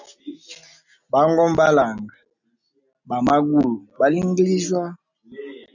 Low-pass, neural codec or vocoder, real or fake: 7.2 kHz; none; real